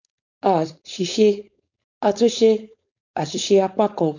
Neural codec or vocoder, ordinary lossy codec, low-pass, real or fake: codec, 16 kHz, 4.8 kbps, FACodec; none; 7.2 kHz; fake